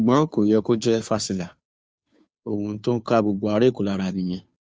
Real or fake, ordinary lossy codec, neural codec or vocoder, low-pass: fake; none; codec, 16 kHz, 2 kbps, FunCodec, trained on Chinese and English, 25 frames a second; none